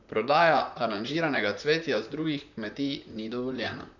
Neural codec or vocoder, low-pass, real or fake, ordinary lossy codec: vocoder, 44.1 kHz, 128 mel bands, Pupu-Vocoder; 7.2 kHz; fake; none